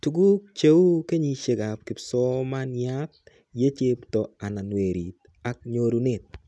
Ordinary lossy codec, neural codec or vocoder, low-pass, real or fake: none; none; none; real